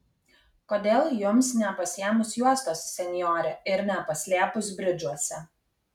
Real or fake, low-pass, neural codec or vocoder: real; 19.8 kHz; none